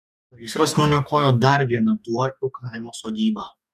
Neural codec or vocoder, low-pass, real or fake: codec, 44.1 kHz, 2.6 kbps, DAC; 14.4 kHz; fake